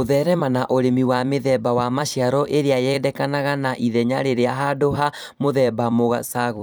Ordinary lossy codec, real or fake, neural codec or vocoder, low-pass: none; fake; vocoder, 44.1 kHz, 128 mel bands every 256 samples, BigVGAN v2; none